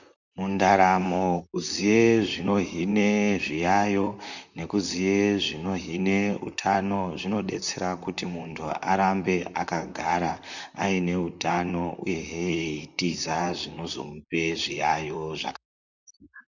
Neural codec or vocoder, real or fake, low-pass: vocoder, 44.1 kHz, 128 mel bands, Pupu-Vocoder; fake; 7.2 kHz